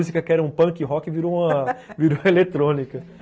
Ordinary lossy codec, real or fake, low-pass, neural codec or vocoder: none; real; none; none